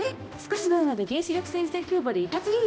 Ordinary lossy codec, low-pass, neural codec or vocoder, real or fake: none; none; codec, 16 kHz, 1 kbps, X-Codec, HuBERT features, trained on balanced general audio; fake